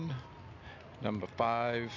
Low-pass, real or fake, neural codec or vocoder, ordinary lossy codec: 7.2 kHz; real; none; AAC, 48 kbps